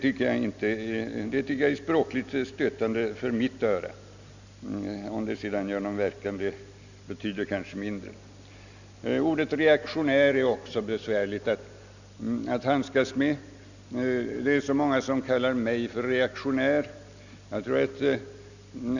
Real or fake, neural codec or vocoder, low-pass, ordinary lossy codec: real; none; 7.2 kHz; none